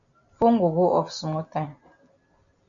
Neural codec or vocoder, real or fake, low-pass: none; real; 7.2 kHz